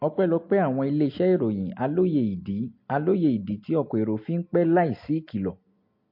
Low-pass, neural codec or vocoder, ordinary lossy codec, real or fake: 5.4 kHz; none; MP3, 32 kbps; real